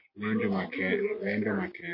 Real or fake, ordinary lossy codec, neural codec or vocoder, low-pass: real; MP3, 32 kbps; none; 5.4 kHz